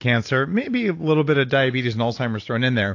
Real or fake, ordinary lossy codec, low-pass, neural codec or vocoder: real; AAC, 48 kbps; 7.2 kHz; none